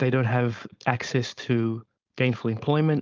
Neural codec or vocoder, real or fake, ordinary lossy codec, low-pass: codec, 16 kHz, 4.8 kbps, FACodec; fake; Opus, 32 kbps; 7.2 kHz